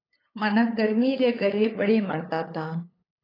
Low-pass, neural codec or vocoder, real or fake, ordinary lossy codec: 5.4 kHz; codec, 16 kHz, 8 kbps, FunCodec, trained on LibriTTS, 25 frames a second; fake; AAC, 24 kbps